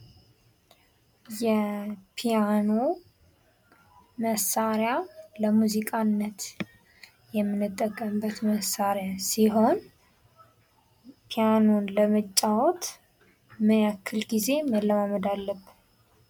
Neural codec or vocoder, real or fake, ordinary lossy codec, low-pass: none; real; MP3, 96 kbps; 19.8 kHz